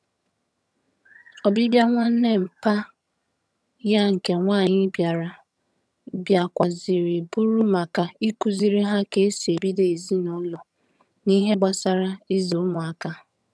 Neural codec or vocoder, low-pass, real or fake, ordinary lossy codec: vocoder, 22.05 kHz, 80 mel bands, HiFi-GAN; none; fake; none